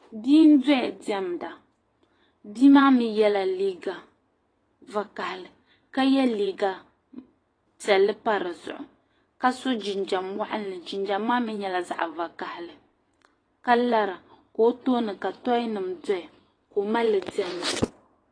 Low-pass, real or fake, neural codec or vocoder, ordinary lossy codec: 9.9 kHz; fake; vocoder, 22.05 kHz, 80 mel bands, WaveNeXt; AAC, 32 kbps